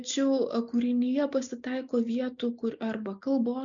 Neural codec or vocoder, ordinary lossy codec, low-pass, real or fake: none; MP3, 96 kbps; 7.2 kHz; real